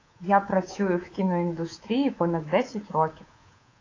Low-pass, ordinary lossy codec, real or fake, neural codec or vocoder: 7.2 kHz; AAC, 32 kbps; fake; codec, 24 kHz, 3.1 kbps, DualCodec